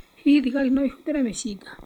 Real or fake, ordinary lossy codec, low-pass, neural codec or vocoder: fake; none; 19.8 kHz; vocoder, 44.1 kHz, 128 mel bands every 256 samples, BigVGAN v2